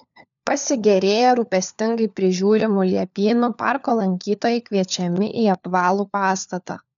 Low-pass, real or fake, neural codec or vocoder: 7.2 kHz; fake; codec, 16 kHz, 4 kbps, FunCodec, trained on LibriTTS, 50 frames a second